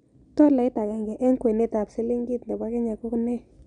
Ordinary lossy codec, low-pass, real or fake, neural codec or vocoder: none; 9.9 kHz; real; none